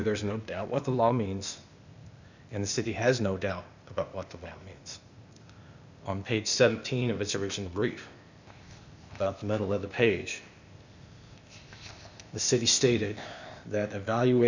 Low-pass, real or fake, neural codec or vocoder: 7.2 kHz; fake; codec, 16 kHz, 0.8 kbps, ZipCodec